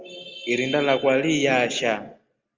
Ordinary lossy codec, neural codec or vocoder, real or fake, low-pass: Opus, 32 kbps; none; real; 7.2 kHz